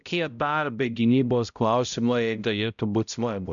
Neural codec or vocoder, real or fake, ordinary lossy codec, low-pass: codec, 16 kHz, 0.5 kbps, X-Codec, HuBERT features, trained on balanced general audio; fake; MP3, 96 kbps; 7.2 kHz